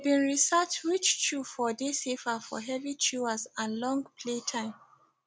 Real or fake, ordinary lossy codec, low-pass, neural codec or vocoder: real; none; none; none